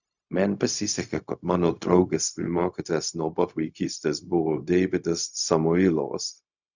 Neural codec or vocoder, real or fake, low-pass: codec, 16 kHz, 0.4 kbps, LongCat-Audio-Codec; fake; 7.2 kHz